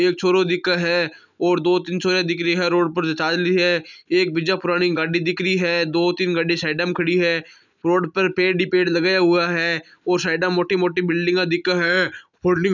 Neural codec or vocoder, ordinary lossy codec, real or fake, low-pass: none; none; real; 7.2 kHz